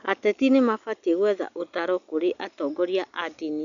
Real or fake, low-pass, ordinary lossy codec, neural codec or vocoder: real; 7.2 kHz; none; none